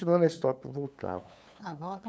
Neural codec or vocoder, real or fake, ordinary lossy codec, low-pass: codec, 16 kHz, 8 kbps, FunCodec, trained on LibriTTS, 25 frames a second; fake; none; none